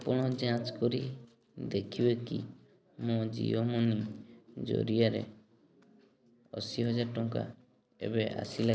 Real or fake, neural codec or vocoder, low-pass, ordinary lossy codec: real; none; none; none